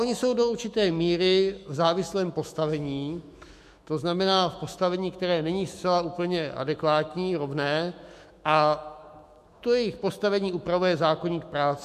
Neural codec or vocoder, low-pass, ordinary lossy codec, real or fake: autoencoder, 48 kHz, 128 numbers a frame, DAC-VAE, trained on Japanese speech; 14.4 kHz; MP3, 64 kbps; fake